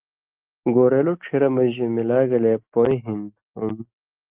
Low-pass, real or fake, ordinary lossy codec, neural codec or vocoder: 3.6 kHz; real; Opus, 32 kbps; none